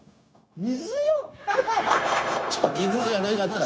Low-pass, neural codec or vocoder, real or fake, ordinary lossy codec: none; codec, 16 kHz, 0.9 kbps, LongCat-Audio-Codec; fake; none